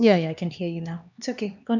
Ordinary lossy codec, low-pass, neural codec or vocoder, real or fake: none; 7.2 kHz; codec, 16 kHz, 2 kbps, X-Codec, HuBERT features, trained on LibriSpeech; fake